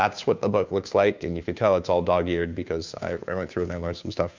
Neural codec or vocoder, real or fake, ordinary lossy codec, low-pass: codec, 16 kHz, 2 kbps, FunCodec, trained on Chinese and English, 25 frames a second; fake; MP3, 64 kbps; 7.2 kHz